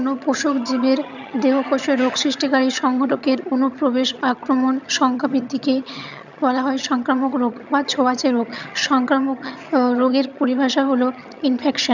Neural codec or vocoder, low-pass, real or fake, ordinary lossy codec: vocoder, 22.05 kHz, 80 mel bands, HiFi-GAN; 7.2 kHz; fake; none